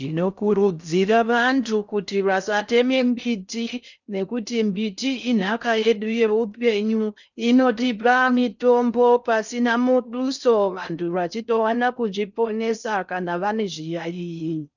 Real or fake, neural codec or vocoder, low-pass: fake; codec, 16 kHz in and 24 kHz out, 0.6 kbps, FocalCodec, streaming, 4096 codes; 7.2 kHz